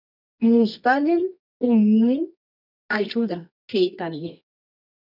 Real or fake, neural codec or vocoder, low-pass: fake; codec, 24 kHz, 0.9 kbps, WavTokenizer, medium music audio release; 5.4 kHz